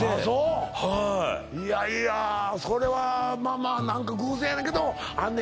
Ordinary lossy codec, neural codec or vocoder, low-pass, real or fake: none; none; none; real